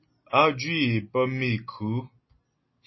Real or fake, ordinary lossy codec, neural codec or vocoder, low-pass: real; MP3, 24 kbps; none; 7.2 kHz